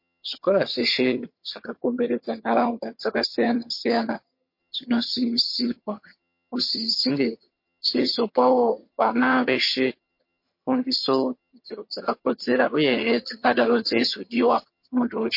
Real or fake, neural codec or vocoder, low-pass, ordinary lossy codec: fake; vocoder, 22.05 kHz, 80 mel bands, HiFi-GAN; 5.4 kHz; MP3, 32 kbps